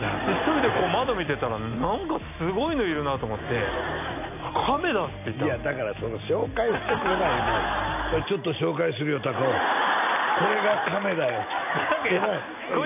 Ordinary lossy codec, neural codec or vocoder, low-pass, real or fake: AAC, 32 kbps; none; 3.6 kHz; real